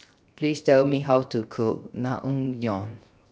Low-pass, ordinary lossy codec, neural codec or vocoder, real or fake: none; none; codec, 16 kHz, 0.7 kbps, FocalCodec; fake